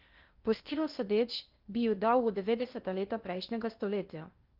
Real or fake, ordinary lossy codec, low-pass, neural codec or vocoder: fake; Opus, 32 kbps; 5.4 kHz; codec, 16 kHz in and 24 kHz out, 0.6 kbps, FocalCodec, streaming, 2048 codes